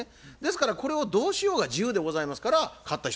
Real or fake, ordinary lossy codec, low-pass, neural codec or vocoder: real; none; none; none